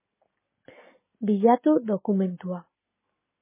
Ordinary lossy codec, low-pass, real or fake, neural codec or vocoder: MP3, 16 kbps; 3.6 kHz; real; none